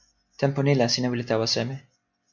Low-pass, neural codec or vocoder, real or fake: 7.2 kHz; none; real